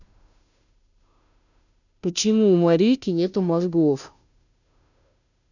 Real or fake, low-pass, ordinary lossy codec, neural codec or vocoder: fake; 7.2 kHz; none; codec, 16 kHz, 0.5 kbps, FunCodec, trained on Chinese and English, 25 frames a second